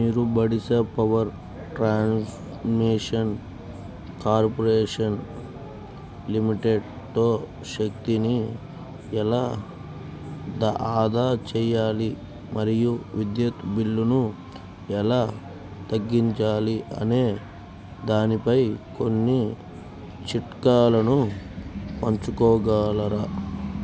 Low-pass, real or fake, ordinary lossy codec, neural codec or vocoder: none; real; none; none